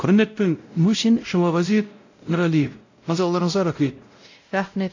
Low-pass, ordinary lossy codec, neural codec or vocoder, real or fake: 7.2 kHz; AAC, 48 kbps; codec, 16 kHz, 0.5 kbps, X-Codec, WavLM features, trained on Multilingual LibriSpeech; fake